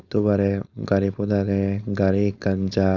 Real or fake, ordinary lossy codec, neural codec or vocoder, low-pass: fake; Opus, 64 kbps; codec, 16 kHz, 4.8 kbps, FACodec; 7.2 kHz